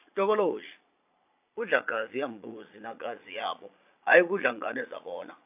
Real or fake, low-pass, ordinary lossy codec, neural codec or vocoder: fake; 3.6 kHz; AAC, 32 kbps; vocoder, 22.05 kHz, 80 mel bands, Vocos